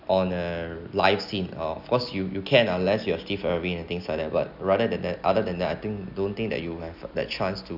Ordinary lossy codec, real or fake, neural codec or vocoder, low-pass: none; real; none; 5.4 kHz